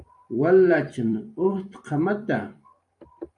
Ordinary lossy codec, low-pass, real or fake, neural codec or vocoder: AAC, 64 kbps; 10.8 kHz; fake; vocoder, 44.1 kHz, 128 mel bands every 256 samples, BigVGAN v2